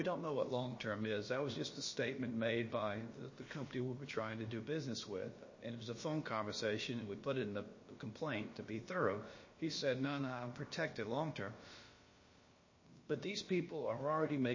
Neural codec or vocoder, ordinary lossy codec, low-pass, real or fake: codec, 16 kHz, about 1 kbps, DyCAST, with the encoder's durations; MP3, 32 kbps; 7.2 kHz; fake